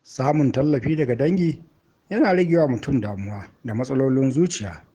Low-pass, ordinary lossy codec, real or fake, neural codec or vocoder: 19.8 kHz; Opus, 16 kbps; fake; autoencoder, 48 kHz, 128 numbers a frame, DAC-VAE, trained on Japanese speech